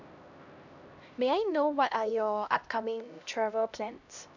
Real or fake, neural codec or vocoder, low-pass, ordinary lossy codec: fake; codec, 16 kHz, 1 kbps, X-Codec, HuBERT features, trained on LibriSpeech; 7.2 kHz; none